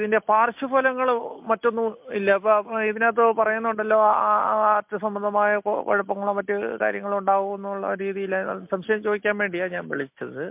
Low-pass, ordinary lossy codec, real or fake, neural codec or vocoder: 3.6 kHz; MP3, 32 kbps; real; none